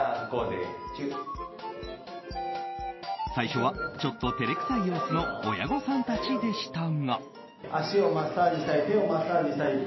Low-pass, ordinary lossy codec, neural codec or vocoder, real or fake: 7.2 kHz; MP3, 24 kbps; none; real